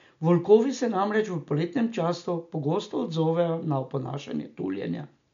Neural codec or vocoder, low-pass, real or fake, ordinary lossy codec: none; 7.2 kHz; real; MP3, 64 kbps